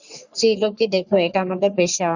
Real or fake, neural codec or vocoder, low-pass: fake; codec, 44.1 kHz, 3.4 kbps, Pupu-Codec; 7.2 kHz